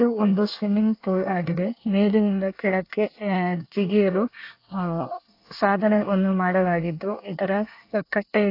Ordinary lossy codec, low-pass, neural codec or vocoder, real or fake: AAC, 32 kbps; 5.4 kHz; codec, 24 kHz, 1 kbps, SNAC; fake